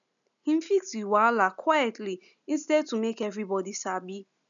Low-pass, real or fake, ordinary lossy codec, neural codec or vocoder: 7.2 kHz; real; none; none